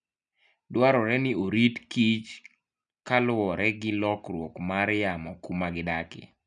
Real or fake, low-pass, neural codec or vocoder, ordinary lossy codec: real; 9.9 kHz; none; none